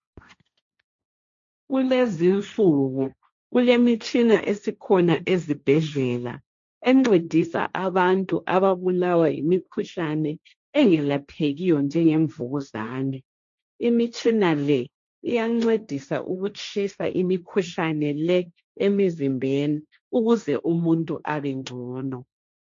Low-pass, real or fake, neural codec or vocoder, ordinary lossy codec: 7.2 kHz; fake; codec, 16 kHz, 1.1 kbps, Voila-Tokenizer; MP3, 48 kbps